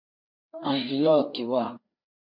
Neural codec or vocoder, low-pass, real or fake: codec, 16 kHz, 4 kbps, FreqCodec, larger model; 5.4 kHz; fake